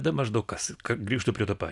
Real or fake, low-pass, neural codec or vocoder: real; 10.8 kHz; none